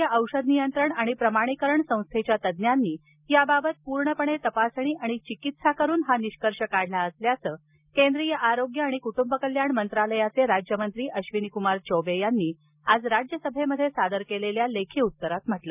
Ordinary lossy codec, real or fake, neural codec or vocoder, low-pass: none; real; none; 3.6 kHz